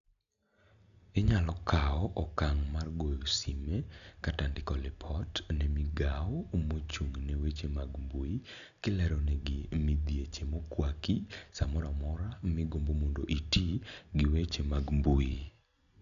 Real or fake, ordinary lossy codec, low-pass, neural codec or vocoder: real; none; 7.2 kHz; none